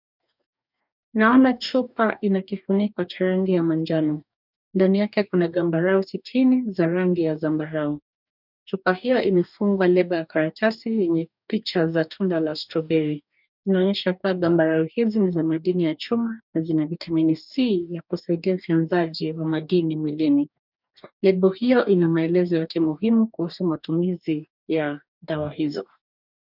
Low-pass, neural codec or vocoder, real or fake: 5.4 kHz; codec, 44.1 kHz, 2.6 kbps, DAC; fake